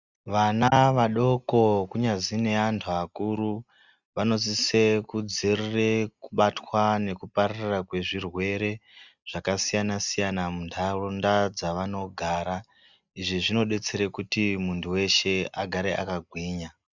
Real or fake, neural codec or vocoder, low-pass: real; none; 7.2 kHz